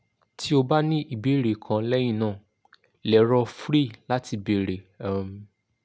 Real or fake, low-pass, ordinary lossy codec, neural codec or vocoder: real; none; none; none